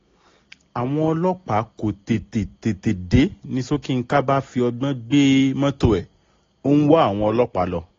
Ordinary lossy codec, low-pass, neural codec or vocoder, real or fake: AAC, 32 kbps; 7.2 kHz; none; real